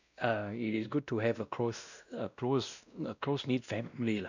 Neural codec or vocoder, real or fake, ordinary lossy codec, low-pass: codec, 16 kHz, 1 kbps, X-Codec, WavLM features, trained on Multilingual LibriSpeech; fake; none; 7.2 kHz